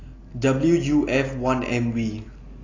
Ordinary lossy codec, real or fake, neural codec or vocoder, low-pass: AAC, 32 kbps; real; none; 7.2 kHz